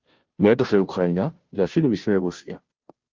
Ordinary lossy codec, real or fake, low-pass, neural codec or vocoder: Opus, 32 kbps; fake; 7.2 kHz; codec, 16 kHz, 0.5 kbps, FunCodec, trained on Chinese and English, 25 frames a second